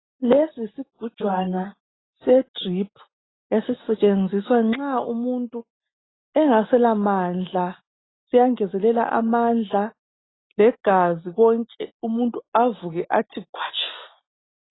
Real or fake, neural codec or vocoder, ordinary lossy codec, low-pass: real; none; AAC, 16 kbps; 7.2 kHz